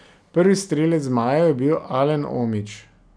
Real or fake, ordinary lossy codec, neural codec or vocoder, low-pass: real; none; none; 9.9 kHz